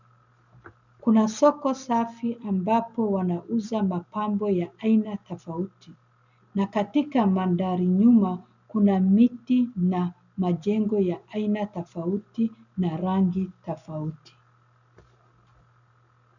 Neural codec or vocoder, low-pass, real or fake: none; 7.2 kHz; real